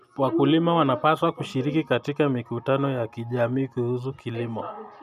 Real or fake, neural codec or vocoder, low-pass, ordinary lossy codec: real; none; 14.4 kHz; none